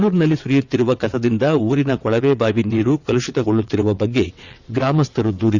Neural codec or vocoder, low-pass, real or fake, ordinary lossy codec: vocoder, 22.05 kHz, 80 mel bands, WaveNeXt; 7.2 kHz; fake; none